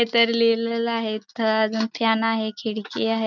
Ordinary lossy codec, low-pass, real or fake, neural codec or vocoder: none; 7.2 kHz; real; none